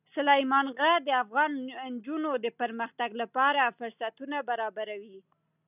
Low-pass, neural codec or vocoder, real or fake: 3.6 kHz; none; real